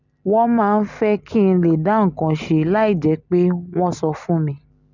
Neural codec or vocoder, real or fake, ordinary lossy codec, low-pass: none; real; none; 7.2 kHz